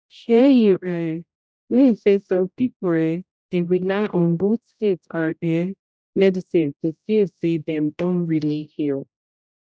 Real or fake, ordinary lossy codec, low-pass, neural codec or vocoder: fake; none; none; codec, 16 kHz, 0.5 kbps, X-Codec, HuBERT features, trained on general audio